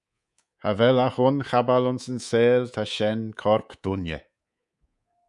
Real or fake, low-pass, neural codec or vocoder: fake; 10.8 kHz; codec, 24 kHz, 3.1 kbps, DualCodec